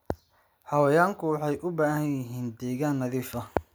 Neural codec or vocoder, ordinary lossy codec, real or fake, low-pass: none; none; real; none